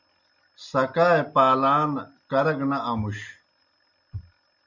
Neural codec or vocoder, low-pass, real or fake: none; 7.2 kHz; real